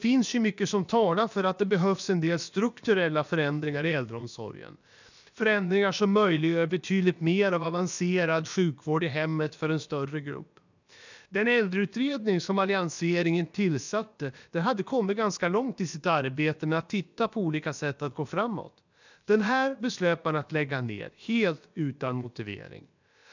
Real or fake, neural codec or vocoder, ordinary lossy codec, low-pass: fake; codec, 16 kHz, about 1 kbps, DyCAST, with the encoder's durations; none; 7.2 kHz